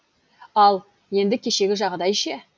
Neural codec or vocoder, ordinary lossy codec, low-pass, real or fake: none; none; none; real